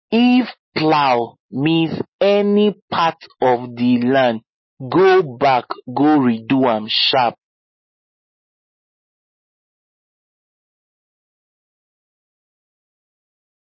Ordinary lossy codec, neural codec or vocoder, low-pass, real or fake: MP3, 24 kbps; none; 7.2 kHz; real